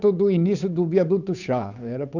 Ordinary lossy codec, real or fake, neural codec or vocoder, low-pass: none; fake; codec, 16 kHz, 8 kbps, FunCodec, trained on Chinese and English, 25 frames a second; 7.2 kHz